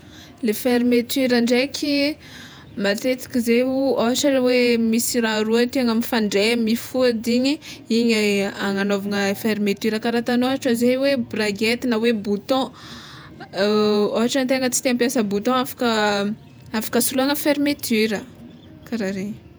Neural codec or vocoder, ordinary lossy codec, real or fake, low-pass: vocoder, 48 kHz, 128 mel bands, Vocos; none; fake; none